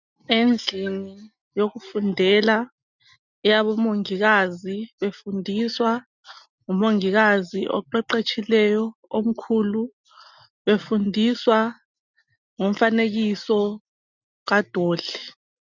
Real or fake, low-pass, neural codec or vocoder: real; 7.2 kHz; none